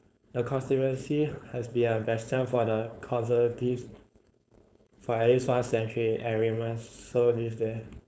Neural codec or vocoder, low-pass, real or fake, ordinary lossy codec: codec, 16 kHz, 4.8 kbps, FACodec; none; fake; none